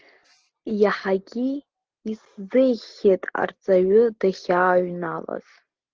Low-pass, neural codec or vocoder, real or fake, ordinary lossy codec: 7.2 kHz; none; real; Opus, 16 kbps